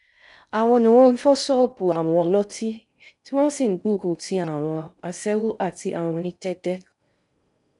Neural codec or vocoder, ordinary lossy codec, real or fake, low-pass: codec, 16 kHz in and 24 kHz out, 0.6 kbps, FocalCodec, streaming, 4096 codes; none; fake; 10.8 kHz